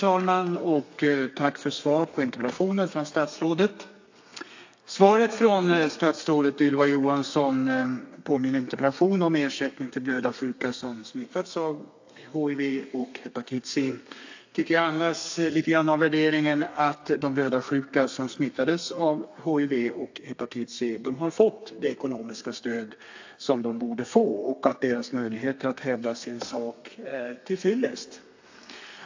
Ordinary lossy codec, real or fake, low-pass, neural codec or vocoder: AAC, 48 kbps; fake; 7.2 kHz; codec, 32 kHz, 1.9 kbps, SNAC